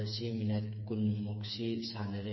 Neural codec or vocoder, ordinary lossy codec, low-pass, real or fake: codec, 16 kHz, 4 kbps, FreqCodec, smaller model; MP3, 24 kbps; 7.2 kHz; fake